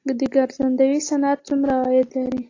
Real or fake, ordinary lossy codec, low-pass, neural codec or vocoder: real; AAC, 32 kbps; 7.2 kHz; none